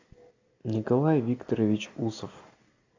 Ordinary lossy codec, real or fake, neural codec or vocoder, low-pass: AAC, 48 kbps; real; none; 7.2 kHz